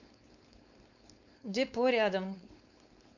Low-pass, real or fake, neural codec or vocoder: 7.2 kHz; fake; codec, 16 kHz, 4.8 kbps, FACodec